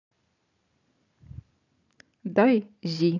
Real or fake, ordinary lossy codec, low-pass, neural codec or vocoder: real; none; 7.2 kHz; none